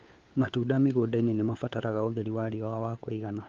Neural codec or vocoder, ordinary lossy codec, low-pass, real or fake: codec, 16 kHz, 4 kbps, FunCodec, trained on LibriTTS, 50 frames a second; Opus, 32 kbps; 7.2 kHz; fake